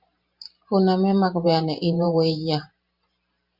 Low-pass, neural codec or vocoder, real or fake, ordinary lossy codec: 5.4 kHz; vocoder, 44.1 kHz, 128 mel bands every 512 samples, BigVGAN v2; fake; Opus, 64 kbps